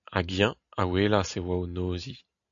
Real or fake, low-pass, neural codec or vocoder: real; 7.2 kHz; none